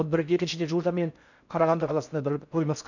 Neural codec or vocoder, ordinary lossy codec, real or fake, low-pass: codec, 16 kHz in and 24 kHz out, 0.6 kbps, FocalCodec, streaming, 4096 codes; none; fake; 7.2 kHz